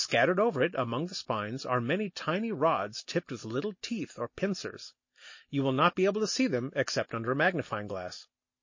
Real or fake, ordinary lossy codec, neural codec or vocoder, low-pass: real; MP3, 32 kbps; none; 7.2 kHz